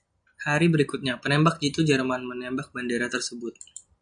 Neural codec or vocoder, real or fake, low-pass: none; real; 9.9 kHz